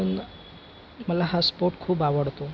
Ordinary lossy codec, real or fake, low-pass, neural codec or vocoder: none; real; none; none